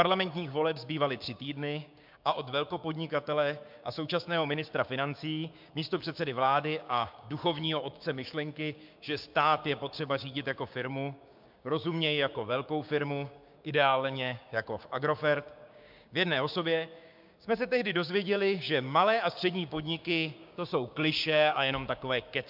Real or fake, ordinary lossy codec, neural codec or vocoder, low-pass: fake; MP3, 48 kbps; codec, 16 kHz, 6 kbps, DAC; 5.4 kHz